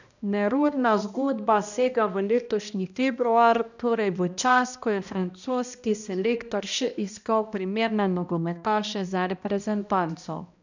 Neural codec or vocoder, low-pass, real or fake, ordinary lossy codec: codec, 16 kHz, 1 kbps, X-Codec, HuBERT features, trained on balanced general audio; 7.2 kHz; fake; none